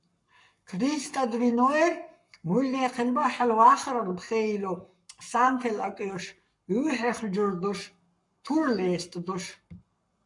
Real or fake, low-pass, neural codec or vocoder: fake; 10.8 kHz; codec, 44.1 kHz, 7.8 kbps, Pupu-Codec